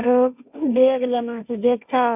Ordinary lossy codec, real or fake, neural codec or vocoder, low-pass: none; fake; codec, 16 kHz, 1.1 kbps, Voila-Tokenizer; 3.6 kHz